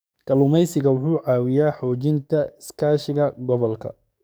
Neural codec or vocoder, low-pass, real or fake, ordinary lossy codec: codec, 44.1 kHz, 7.8 kbps, DAC; none; fake; none